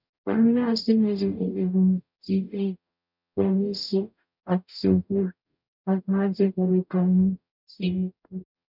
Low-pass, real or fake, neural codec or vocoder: 5.4 kHz; fake; codec, 44.1 kHz, 0.9 kbps, DAC